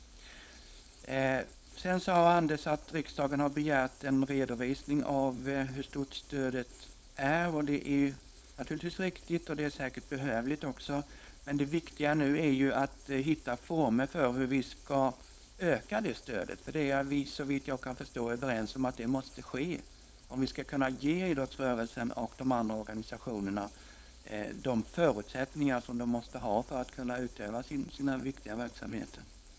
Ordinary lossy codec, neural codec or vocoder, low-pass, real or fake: none; codec, 16 kHz, 4.8 kbps, FACodec; none; fake